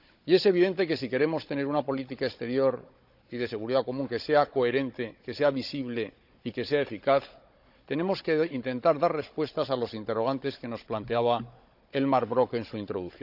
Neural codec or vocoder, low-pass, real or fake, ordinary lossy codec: codec, 16 kHz, 16 kbps, FunCodec, trained on Chinese and English, 50 frames a second; 5.4 kHz; fake; none